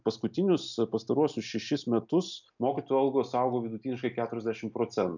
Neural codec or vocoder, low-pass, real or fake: none; 7.2 kHz; real